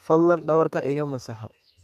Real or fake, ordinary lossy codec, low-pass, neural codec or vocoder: fake; none; 14.4 kHz; codec, 32 kHz, 1.9 kbps, SNAC